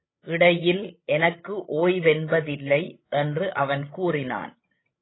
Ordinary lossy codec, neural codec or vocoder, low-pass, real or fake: AAC, 16 kbps; codec, 16 kHz, 16 kbps, FreqCodec, larger model; 7.2 kHz; fake